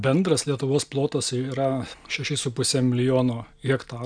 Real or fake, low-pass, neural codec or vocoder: real; 9.9 kHz; none